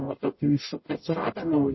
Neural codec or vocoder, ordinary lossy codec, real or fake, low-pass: codec, 44.1 kHz, 0.9 kbps, DAC; MP3, 24 kbps; fake; 7.2 kHz